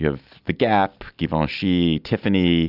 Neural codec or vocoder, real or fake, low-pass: none; real; 5.4 kHz